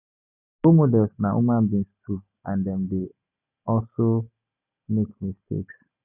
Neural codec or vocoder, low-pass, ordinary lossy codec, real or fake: none; 3.6 kHz; none; real